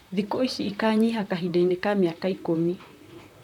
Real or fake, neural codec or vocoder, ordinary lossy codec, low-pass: fake; vocoder, 44.1 kHz, 128 mel bands, Pupu-Vocoder; none; 19.8 kHz